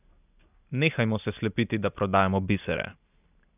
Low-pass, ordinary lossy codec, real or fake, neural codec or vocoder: 3.6 kHz; none; real; none